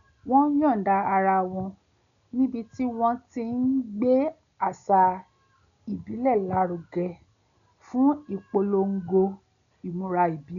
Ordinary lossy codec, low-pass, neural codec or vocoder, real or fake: none; 7.2 kHz; none; real